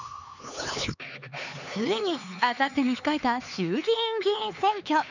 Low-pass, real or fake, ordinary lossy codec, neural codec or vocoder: 7.2 kHz; fake; none; codec, 16 kHz, 4 kbps, X-Codec, HuBERT features, trained on LibriSpeech